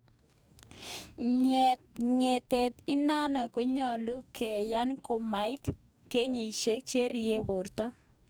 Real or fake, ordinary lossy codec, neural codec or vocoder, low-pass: fake; none; codec, 44.1 kHz, 2.6 kbps, DAC; none